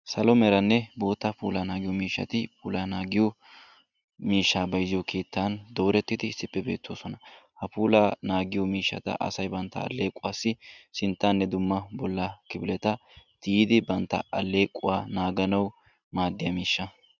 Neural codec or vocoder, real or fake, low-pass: none; real; 7.2 kHz